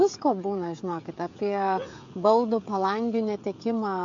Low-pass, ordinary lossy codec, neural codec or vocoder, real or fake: 7.2 kHz; MP3, 48 kbps; codec, 16 kHz, 16 kbps, FreqCodec, smaller model; fake